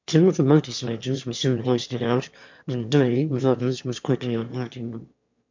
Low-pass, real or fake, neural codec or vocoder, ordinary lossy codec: 7.2 kHz; fake; autoencoder, 22.05 kHz, a latent of 192 numbers a frame, VITS, trained on one speaker; MP3, 64 kbps